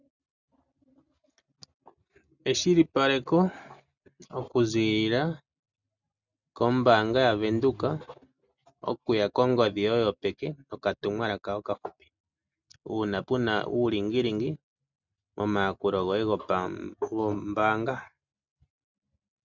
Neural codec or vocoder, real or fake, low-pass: none; real; 7.2 kHz